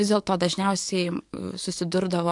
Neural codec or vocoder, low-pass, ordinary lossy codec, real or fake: codec, 44.1 kHz, 7.8 kbps, DAC; 10.8 kHz; AAC, 64 kbps; fake